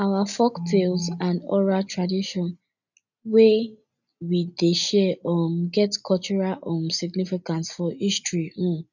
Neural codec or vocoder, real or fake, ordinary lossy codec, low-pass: none; real; none; 7.2 kHz